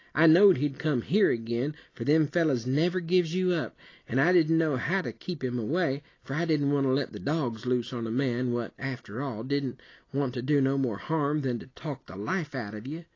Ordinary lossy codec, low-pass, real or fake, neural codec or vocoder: AAC, 32 kbps; 7.2 kHz; real; none